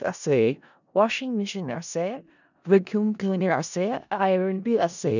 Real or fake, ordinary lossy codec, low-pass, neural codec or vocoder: fake; none; 7.2 kHz; codec, 16 kHz in and 24 kHz out, 0.4 kbps, LongCat-Audio-Codec, four codebook decoder